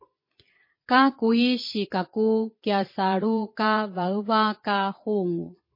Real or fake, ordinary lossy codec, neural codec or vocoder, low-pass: fake; MP3, 24 kbps; vocoder, 22.05 kHz, 80 mel bands, Vocos; 5.4 kHz